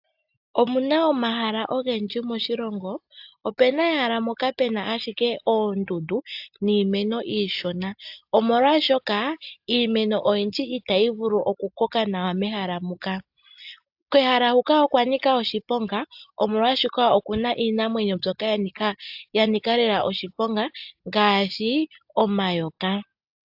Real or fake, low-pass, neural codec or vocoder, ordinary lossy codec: real; 5.4 kHz; none; AAC, 48 kbps